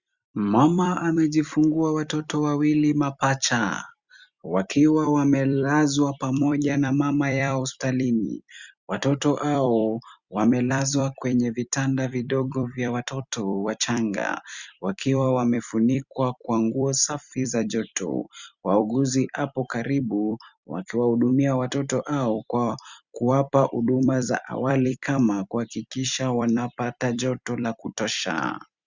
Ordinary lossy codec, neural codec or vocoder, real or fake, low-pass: Opus, 64 kbps; vocoder, 44.1 kHz, 128 mel bands every 256 samples, BigVGAN v2; fake; 7.2 kHz